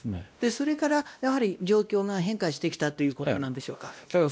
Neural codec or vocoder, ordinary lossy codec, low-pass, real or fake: codec, 16 kHz, 1 kbps, X-Codec, WavLM features, trained on Multilingual LibriSpeech; none; none; fake